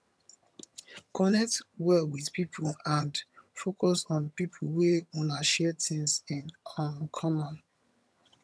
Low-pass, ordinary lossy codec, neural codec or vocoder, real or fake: none; none; vocoder, 22.05 kHz, 80 mel bands, HiFi-GAN; fake